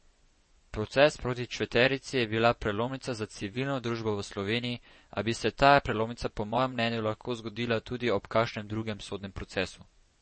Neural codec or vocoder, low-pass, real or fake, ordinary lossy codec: vocoder, 24 kHz, 100 mel bands, Vocos; 10.8 kHz; fake; MP3, 32 kbps